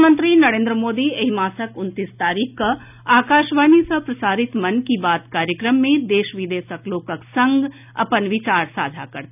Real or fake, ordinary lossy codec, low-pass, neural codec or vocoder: real; none; 3.6 kHz; none